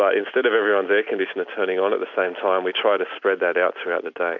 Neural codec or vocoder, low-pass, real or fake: none; 7.2 kHz; real